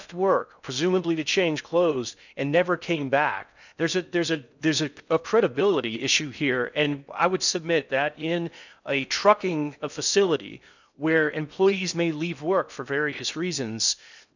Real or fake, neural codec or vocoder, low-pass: fake; codec, 16 kHz in and 24 kHz out, 0.6 kbps, FocalCodec, streaming, 4096 codes; 7.2 kHz